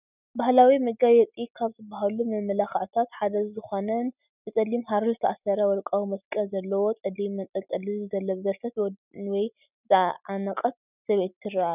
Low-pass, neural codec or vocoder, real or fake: 3.6 kHz; none; real